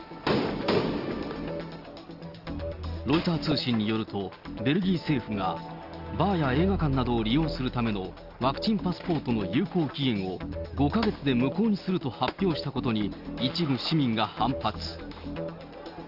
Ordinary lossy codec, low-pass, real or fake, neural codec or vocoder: Opus, 24 kbps; 5.4 kHz; real; none